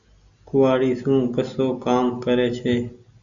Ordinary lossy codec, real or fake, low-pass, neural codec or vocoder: Opus, 64 kbps; real; 7.2 kHz; none